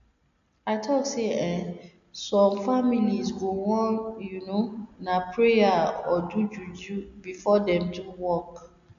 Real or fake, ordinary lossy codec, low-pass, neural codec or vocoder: real; none; 7.2 kHz; none